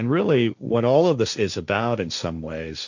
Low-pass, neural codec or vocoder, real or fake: 7.2 kHz; codec, 16 kHz, 1.1 kbps, Voila-Tokenizer; fake